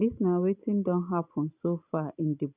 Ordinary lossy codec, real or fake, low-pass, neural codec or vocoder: none; real; 3.6 kHz; none